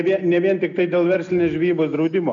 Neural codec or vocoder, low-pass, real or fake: none; 7.2 kHz; real